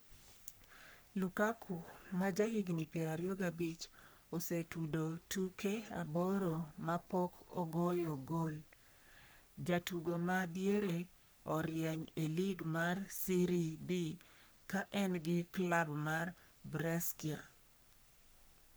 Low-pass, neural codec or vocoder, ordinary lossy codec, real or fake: none; codec, 44.1 kHz, 3.4 kbps, Pupu-Codec; none; fake